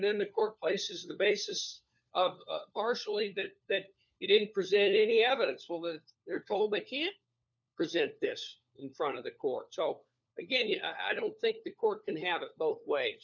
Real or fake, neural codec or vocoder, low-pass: fake; codec, 16 kHz, 4 kbps, FunCodec, trained on LibriTTS, 50 frames a second; 7.2 kHz